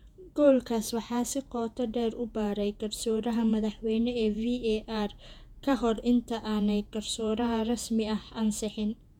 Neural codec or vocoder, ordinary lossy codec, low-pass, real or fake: vocoder, 48 kHz, 128 mel bands, Vocos; none; 19.8 kHz; fake